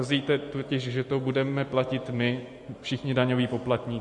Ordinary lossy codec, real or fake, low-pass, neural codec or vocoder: MP3, 48 kbps; real; 10.8 kHz; none